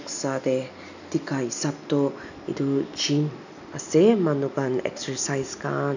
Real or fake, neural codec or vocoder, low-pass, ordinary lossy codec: real; none; 7.2 kHz; none